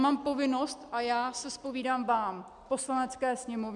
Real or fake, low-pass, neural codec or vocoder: real; 10.8 kHz; none